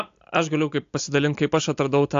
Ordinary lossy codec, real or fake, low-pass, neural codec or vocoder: AAC, 64 kbps; real; 7.2 kHz; none